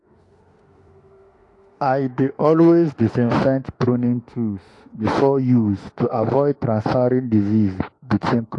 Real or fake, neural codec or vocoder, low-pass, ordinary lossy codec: fake; autoencoder, 48 kHz, 32 numbers a frame, DAC-VAE, trained on Japanese speech; 10.8 kHz; AAC, 48 kbps